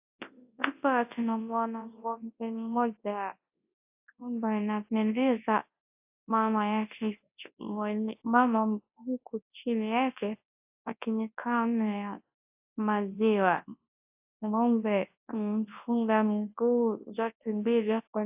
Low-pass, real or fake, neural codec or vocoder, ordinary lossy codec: 3.6 kHz; fake; codec, 24 kHz, 0.9 kbps, WavTokenizer, large speech release; AAC, 32 kbps